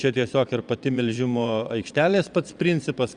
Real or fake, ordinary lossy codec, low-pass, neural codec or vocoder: fake; Opus, 64 kbps; 9.9 kHz; vocoder, 22.05 kHz, 80 mel bands, Vocos